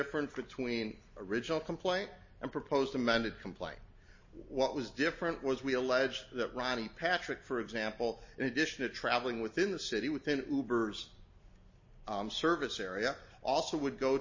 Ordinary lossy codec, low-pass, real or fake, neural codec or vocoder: MP3, 32 kbps; 7.2 kHz; real; none